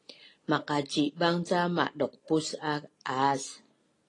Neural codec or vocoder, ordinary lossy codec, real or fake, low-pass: none; AAC, 32 kbps; real; 10.8 kHz